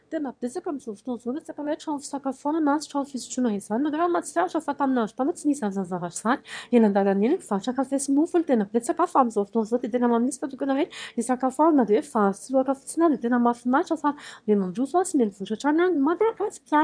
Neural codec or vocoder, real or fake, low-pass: autoencoder, 22.05 kHz, a latent of 192 numbers a frame, VITS, trained on one speaker; fake; 9.9 kHz